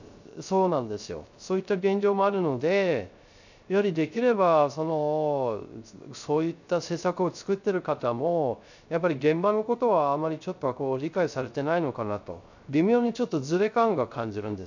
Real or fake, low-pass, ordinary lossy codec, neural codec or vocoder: fake; 7.2 kHz; none; codec, 16 kHz, 0.3 kbps, FocalCodec